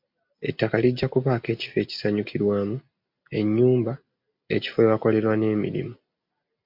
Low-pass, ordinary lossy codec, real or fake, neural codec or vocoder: 5.4 kHz; MP3, 48 kbps; real; none